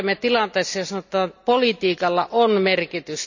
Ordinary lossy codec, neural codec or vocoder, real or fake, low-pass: none; none; real; 7.2 kHz